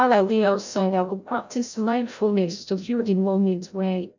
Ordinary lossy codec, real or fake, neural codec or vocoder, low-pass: none; fake; codec, 16 kHz, 0.5 kbps, FreqCodec, larger model; 7.2 kHz